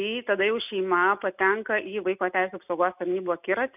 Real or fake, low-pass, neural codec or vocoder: real; 3.6 kHz; none